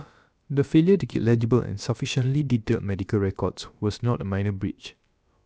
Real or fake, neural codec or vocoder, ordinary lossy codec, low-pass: fake; codec, 16 kHz, about 1 kbps, DyCAST, with the encoder's durations; none; none